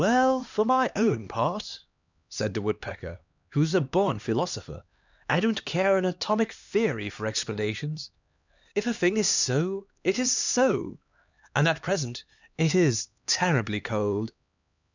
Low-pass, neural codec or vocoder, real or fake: 7.2 kHz; codec, 16 kHz, 2 kbps, X-Codec, HuBERT features, trained on LibriSpeech; fake